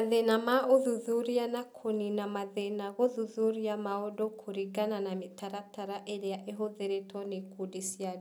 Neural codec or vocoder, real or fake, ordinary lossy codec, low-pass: none; real; none; none